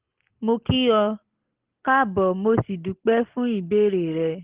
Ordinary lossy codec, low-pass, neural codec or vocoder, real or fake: Opus, 16 kbps; 3.6 kHz; none; real